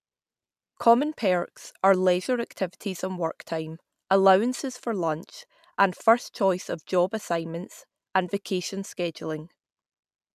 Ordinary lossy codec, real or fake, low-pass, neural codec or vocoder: none; real; 14.4 kHz; none